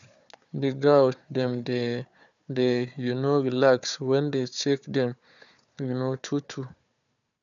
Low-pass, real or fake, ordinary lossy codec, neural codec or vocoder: 7.2 kHz; fake; none; codec, 16 kHz, 4 kbps, FunCodec, trained on Chinese and English, 50 frames a second